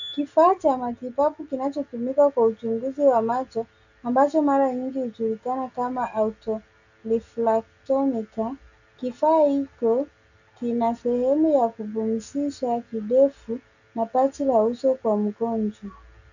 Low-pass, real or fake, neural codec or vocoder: 7.2 kHz; real; none